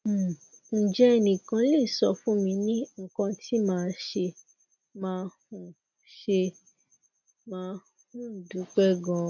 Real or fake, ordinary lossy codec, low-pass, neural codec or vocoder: real; none; 7.2 kHz; none